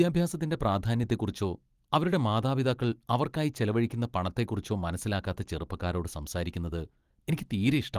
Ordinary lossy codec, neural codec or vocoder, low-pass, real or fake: Opus, 24 kbps; none; 14.4 kHz; real